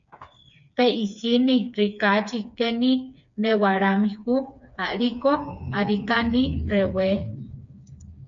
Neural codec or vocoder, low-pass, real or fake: codec, 16 kHz, 4 kbps, FreqCodec, smaller model; 7.2 kHz; fake